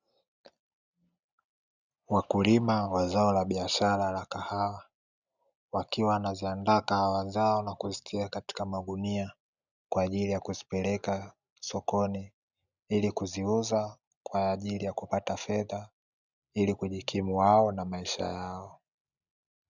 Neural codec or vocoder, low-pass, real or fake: none; 7.2 kHz; real